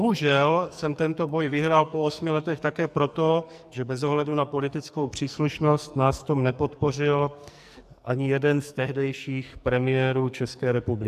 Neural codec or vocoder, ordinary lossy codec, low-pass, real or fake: codec, 44.1 kHz, 2.6 kbps, SNAC; AAC, 96 kbps; 14.4 kHz; fake